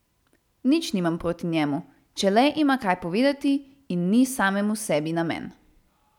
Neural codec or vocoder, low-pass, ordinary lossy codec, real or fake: none; 19.8 kHz; none; real